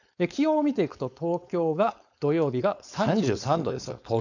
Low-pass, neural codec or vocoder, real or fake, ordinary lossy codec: 7.2 kHz; codec, 16 kHz, 4.8 kbps, FACodec; fake; none